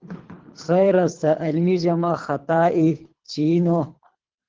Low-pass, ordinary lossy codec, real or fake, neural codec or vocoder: 7.2 kHz; Opus, 16 kbps; fake; codec, 24 kHz, 3 kbps, HILCodec